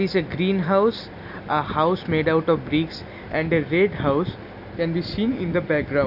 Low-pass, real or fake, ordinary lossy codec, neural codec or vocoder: 5.4 kHz; real; none; none